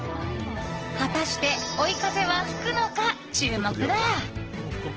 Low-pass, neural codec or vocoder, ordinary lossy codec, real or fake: 7.2 kHz; none; Opus, 16 kbps; real